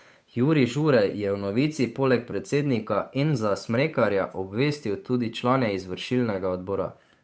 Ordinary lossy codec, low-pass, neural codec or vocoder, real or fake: none; none; codec, 16 kHz, 8 kbps, FunCodec, trained on Chinese and English, 25 frames a second; fake